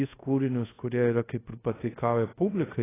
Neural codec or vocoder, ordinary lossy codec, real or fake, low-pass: codec, 24 kHz, 0.5 kbps, DualCodec; AAC, 16 kbps; fake; 3.6 kHz